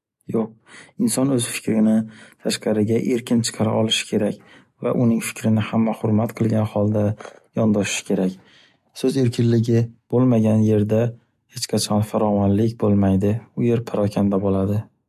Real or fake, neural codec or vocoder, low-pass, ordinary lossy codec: real; none; 14.4 kHz; MP3, 64 kbps